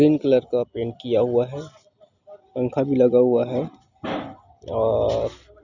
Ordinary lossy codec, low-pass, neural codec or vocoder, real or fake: none; 7.2 kHz; none; real